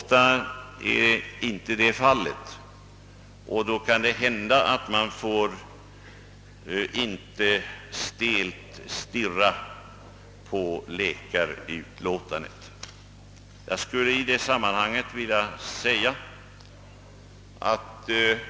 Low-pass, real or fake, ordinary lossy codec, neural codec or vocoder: none; real; none; none